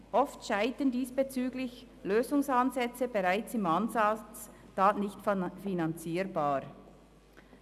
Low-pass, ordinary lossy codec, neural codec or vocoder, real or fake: 14.4 kHz; none; none; real